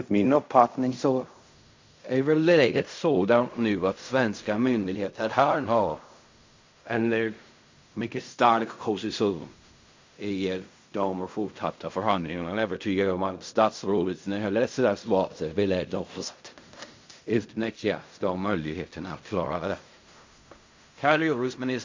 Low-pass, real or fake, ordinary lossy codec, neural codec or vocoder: 7.2 kHz; fake; MP3, 48 kbps; codec, 16 kHz in and 24 kHz out, 0.4 kbps, LongCat-Audio-Codec, fine tuned four codebook decoder